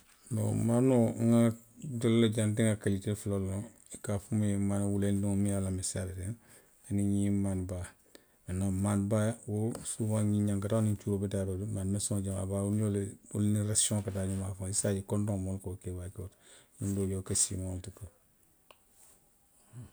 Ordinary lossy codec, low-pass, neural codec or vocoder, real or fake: none; none; none; real